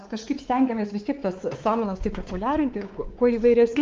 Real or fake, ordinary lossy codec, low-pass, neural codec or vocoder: fake; Opus, 32 kbps; 7.2 kHz; codec, 16 kHz, 4 kbps, X-Codec, WavLM features, trained on Multilingual LibriSpeech